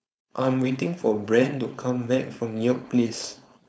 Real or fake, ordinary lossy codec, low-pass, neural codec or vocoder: fake; none; none; codec, 16 kHz, 4.8 kbps, FACodec